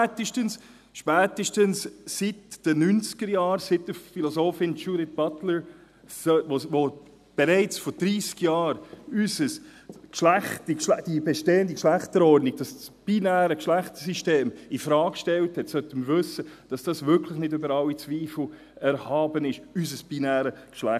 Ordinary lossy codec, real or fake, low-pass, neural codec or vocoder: none; real; 14.4 kHz; none